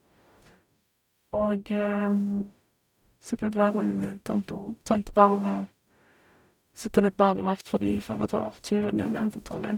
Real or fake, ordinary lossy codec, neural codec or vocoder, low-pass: fake; none; codec, 44.1 kHz, 0.9 kbps, DAC; 19.8 kHz